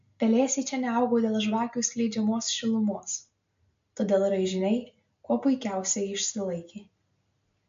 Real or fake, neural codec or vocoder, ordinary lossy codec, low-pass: real; none; MP3, 48 kbps; 7.2 kHz